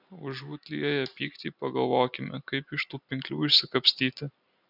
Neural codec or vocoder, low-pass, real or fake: none; 5.4 kHz; real